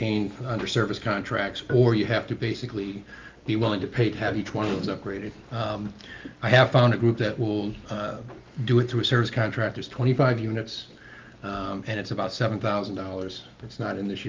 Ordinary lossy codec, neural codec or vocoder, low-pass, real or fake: Opus, 32 kbps; none; 7.2 kHz; real